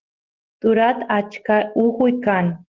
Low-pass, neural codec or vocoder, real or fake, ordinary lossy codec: 7.2 kHz; none; real; Opus, 16 kbps